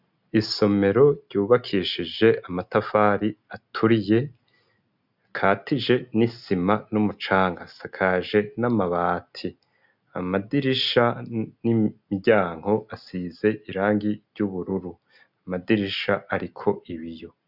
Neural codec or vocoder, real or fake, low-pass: none; real; 5.4 kHz